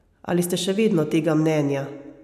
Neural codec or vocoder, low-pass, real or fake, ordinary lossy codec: none; 14.4 kHz; real; none